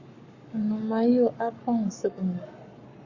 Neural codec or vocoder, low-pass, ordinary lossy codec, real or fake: codec, 44.1 kHz, 7.8 kbps, Pupu-Codec; 7.2 kHz; Opus, 64 kbps; fake